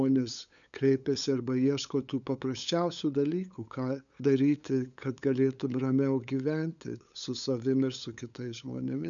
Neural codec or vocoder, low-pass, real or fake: codec, 16 kHz, 8 kbps, FunCodec, trained on LibriTTS, 25 frames a second; 7.2 kHz; fake